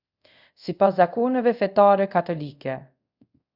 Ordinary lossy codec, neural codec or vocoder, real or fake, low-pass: Opus, 64 kbps; codec, 24 kHz, 0.5 kbps, DualCodec; fake; 5.4 kHz